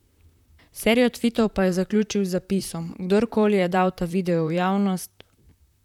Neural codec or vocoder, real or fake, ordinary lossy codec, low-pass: vocoder, 44.1 kHz, 128 mel bands, Pupu-Vocoder; fake; none; 19.8 kHz